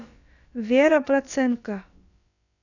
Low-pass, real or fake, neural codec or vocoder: 7.2 kHz; fake; codec, 16 kHz, about 1 kbps, DyCAST, with the encoder's durations